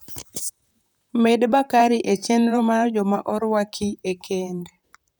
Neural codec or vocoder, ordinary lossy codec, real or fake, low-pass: vocoder, 44.1 kHz, 128 mel bands, Pupu-Vocoder; none; fake; none